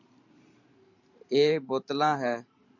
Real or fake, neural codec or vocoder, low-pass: fake; vocoder, 44.1 kHz, 128 mel bands every 512 samples, BigVGAN v2; 7.2 kHz